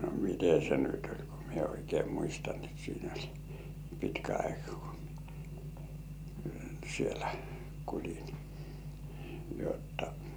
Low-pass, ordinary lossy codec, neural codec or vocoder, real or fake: none; none; none; real